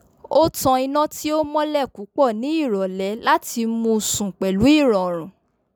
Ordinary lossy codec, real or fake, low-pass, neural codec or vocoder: none; real; none; none